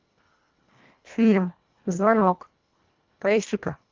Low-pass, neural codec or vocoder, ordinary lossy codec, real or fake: 7.2 kHz; codec, 24 kHz, 1.5 kbps, HILCodec; Opus, 32 kbps; fake